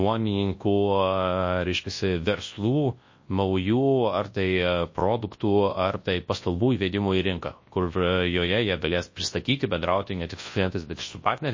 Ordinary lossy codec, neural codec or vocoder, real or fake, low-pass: MP3, 32 kbps; codec, 24 kHz, 0.9 kbps, WavTokenizer, large speech release; fake; 7.2 kHz